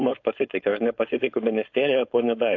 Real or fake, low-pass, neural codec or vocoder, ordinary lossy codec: fake; 7.2 kHz; codec, 16 kHz, 4.8 kbps, FACodec; AAC, 48 kbps